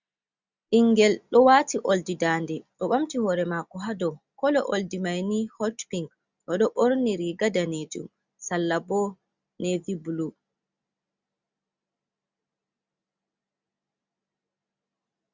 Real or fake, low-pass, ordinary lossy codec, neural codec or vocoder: real; 7.2 kHz; Opus, 64 kbps; none